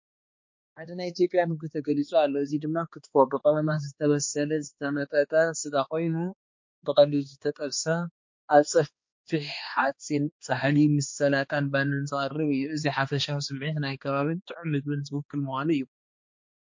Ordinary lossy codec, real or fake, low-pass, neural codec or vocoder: MP3, 48 kbps; fake; 7.2 kHz; codec, 16 kHz, 2 kbps, X-Codec, HuBERT features, trained on balanced general audio